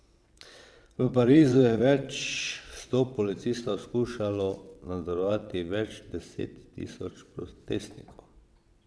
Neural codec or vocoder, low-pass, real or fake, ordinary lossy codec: vocoder, 22.05 kHz, 80 mel bands, WaveNeXt; none; fake; none